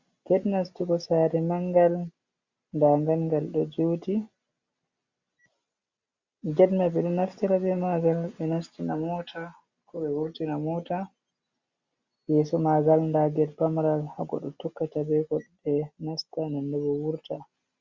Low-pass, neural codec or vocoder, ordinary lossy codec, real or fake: 7.2 kHz; none; Opus, 64 kbps; real